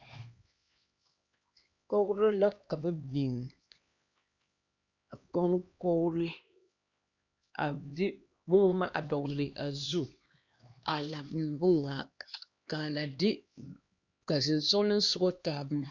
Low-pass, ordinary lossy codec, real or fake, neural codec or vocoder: 7.2 kHz; Opus, 64 kbps; fake; codec, 16 kHz, 2 kbps, X-Codec, HuBERT features, trained on LibriSpeech